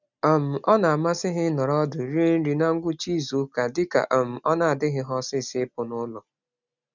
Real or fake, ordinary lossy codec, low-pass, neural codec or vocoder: real; none; 7.2 kHz; none